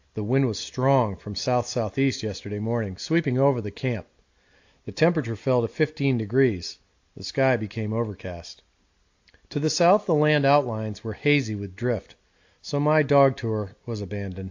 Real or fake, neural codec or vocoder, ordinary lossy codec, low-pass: real; none; AAC, 48 kbps; 7.2 kHz